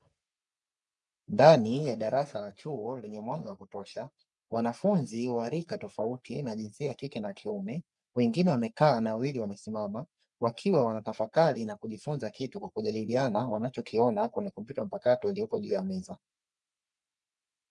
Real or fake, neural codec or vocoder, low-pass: fake; codec, 44.1 kHz, 3.4 kbps, Pupu-Codec; 10.8 kHz